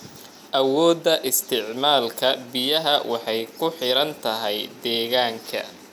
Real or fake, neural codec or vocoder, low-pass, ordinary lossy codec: real; none; 19.8 kHz; none